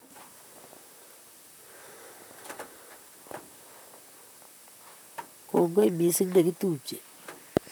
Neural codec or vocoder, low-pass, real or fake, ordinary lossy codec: vocoder, 44.1 kHz, 128 mel bands, Pupu-Vocoder; none; fake; none